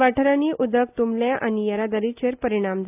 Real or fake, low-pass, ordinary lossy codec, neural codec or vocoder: real; 3.6 kHz; AAC, 32 kbps; none